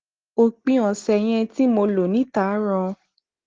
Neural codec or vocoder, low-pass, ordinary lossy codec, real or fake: none; 7.2 kHz; Opus, 16 kbps; real